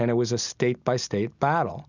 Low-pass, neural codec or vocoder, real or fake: 7.2 kHz; none; real